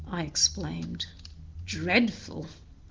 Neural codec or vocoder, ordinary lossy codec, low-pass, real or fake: none; Opus, 24 kbps; 7.2 kHz; real